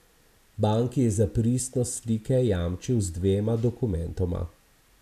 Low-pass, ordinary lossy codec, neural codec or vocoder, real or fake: 14.4 kHz; none; none; real